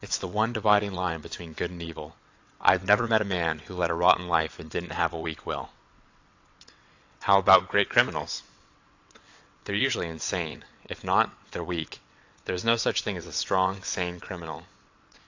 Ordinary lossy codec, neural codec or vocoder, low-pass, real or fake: AAC, 48 kbps; vocoder, 22.05 kHz, 80 mel bands, WaveNeXt; 7.2 kHz; fake